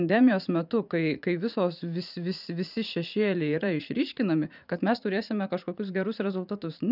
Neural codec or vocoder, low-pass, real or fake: vocoder, 44.1 kHz, 80 mel bands, Vocos; 5.4 kHz; fake